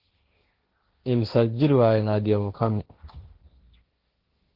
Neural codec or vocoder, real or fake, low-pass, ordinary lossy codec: codec, 16 kHz, 1.1 kbps, Voila-Tokenizer; fake; 5.4 kHz; Opus, 16 kbps